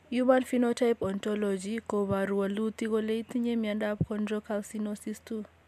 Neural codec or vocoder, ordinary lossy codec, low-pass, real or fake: none; MP3, 96 kbps; 14.4 kHz; real